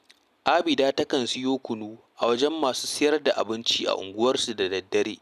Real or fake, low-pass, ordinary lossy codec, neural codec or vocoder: real; 14.4 kHz; none; none